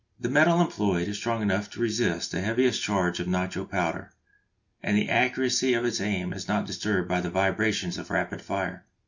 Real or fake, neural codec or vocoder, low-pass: real; none; 7.2 kHz